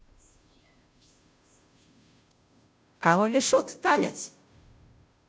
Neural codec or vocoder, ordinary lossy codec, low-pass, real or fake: codec, 16 kHz, 0.5 kbps, FunCodec, trained on Chinese and English, 25 frames a second; none; none; fake